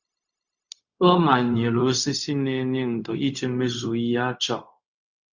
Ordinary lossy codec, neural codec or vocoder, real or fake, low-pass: Opus, 64 kbps; codec, 16 kHz, 0.4 kbps, LongCat-Audio-Codec; fake; 7.2 kHz